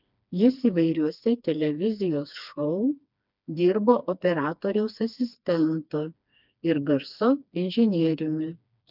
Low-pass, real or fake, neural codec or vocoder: 5.4 kHz; fake; codec, 16 kHz, 2 kbps, FreqCodec, smaller model